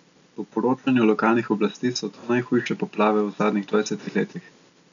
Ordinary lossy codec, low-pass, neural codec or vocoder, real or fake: none; 7.2 kHz; none; real